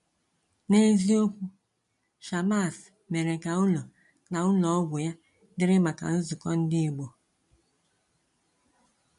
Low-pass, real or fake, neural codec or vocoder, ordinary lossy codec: 10.8 kHz; real; none; MP3, 48 kbps